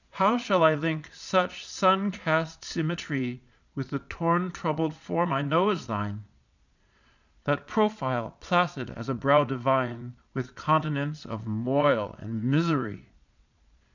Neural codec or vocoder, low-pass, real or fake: vocoder, 22.05 kHz, 80 mel bands, Vocos; 7.2 kHz; fake